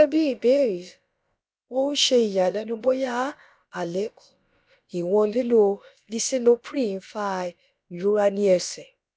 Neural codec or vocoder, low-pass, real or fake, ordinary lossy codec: codec, 16 kHz, about 1 kbps, DyCAST, with the encoder's durations; none; fake; none